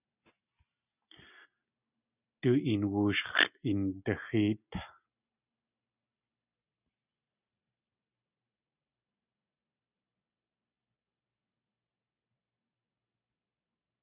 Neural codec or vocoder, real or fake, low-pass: none; real; 3.6 kHz